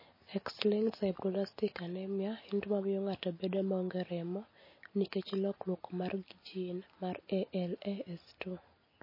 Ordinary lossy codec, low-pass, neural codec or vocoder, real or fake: MP3, 24 kbps; 5.4 kHz; none; real